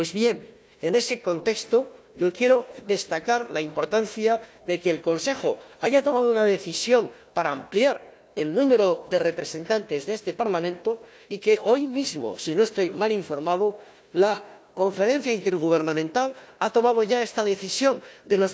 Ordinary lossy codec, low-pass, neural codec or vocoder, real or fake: none; none; codec, 16 kHz, 1 kbps, FunCodec, trained on Chinese and English, 50 frames a second; fake